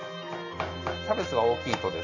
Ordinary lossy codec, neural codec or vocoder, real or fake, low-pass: none; none; real; 7.2 kHz